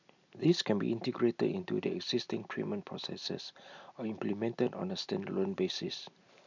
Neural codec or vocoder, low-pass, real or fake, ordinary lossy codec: none; 7.2 kHz; real; none